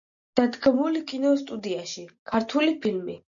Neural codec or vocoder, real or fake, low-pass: none; real; 7.2 kHz